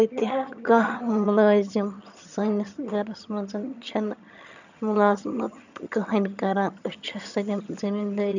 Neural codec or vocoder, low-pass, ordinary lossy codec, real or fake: vocoder, 22.05 kHz, 80 mel bands, HiFi-GAN; 7.2 kHz; none; fake